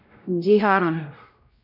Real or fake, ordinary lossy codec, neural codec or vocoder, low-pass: fake; none; codec, 16 kHz, 0.5 kbps, X-Codec, WavLM features, trained on Multilingual LibriSpeech; 5.4 kHz